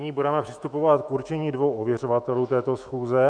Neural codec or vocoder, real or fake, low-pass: autoencoder, 48 kHz, 128 numbers a frame, DAC-VAE, trained on Japanese speech; fake; 9.9 kHz